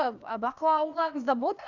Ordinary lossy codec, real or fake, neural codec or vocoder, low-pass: none; fake; codec, 16 kHz, 0.8 kbps, ZipCodec; 7.2 kHz